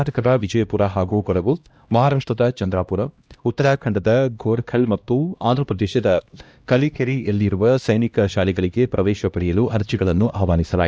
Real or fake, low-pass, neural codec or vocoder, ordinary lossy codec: fake; none; codec, 16 kHz, 1 kbps, X-Codec, HuBERT features, trained on LibriSpeech; none